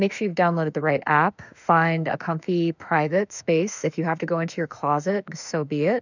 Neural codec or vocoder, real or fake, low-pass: autoencoder, 48 kHz, 32 numbers a frame, DAC-VAE, trained on Japanese speech; fake; 7.2 kHz